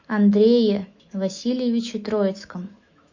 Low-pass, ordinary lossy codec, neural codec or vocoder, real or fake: 7.2 kHz; MP3, 64 kbps; autoencoder, 48 kHz, 128 numbers a frame, DAC-VAE, trained on Japanese speech; fake